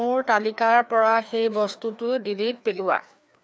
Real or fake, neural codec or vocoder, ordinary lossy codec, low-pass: fake; codec, 16 kHz, 2 kbps, FreqCodec, larger model; none; none